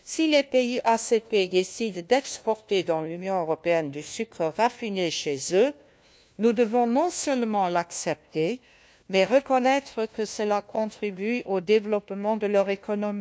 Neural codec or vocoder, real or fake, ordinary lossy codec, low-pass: codec, 16 kHz, 1 kbps, FunCodec, trained on LibriTTS, 50 frames a second; fake; none; none